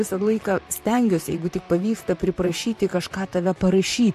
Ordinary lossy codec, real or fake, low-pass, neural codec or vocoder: MP3, 64 kbps; fake; 14.4 kHz; vocoder, 44.1 kHz, 128 mel bands, Pupu-Vocoder